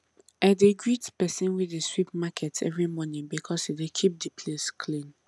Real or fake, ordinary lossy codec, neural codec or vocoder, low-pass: real; none; none; none